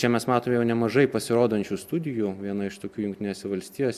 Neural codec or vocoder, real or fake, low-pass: none; real; 14.4 kHz